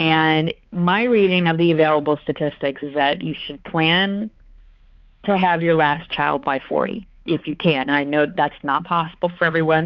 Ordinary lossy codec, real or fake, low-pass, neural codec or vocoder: Opus, 64 kbps; fake; 7.2 kHz; codec, 16 kHz, 4 kbps, X-Codec, HuBERT features, trained on balanced general audio